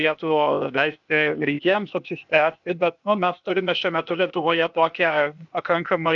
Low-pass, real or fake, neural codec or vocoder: 7.2 kHz; fake; codec, 16 kHz, 0.8 kbps, ZipCodec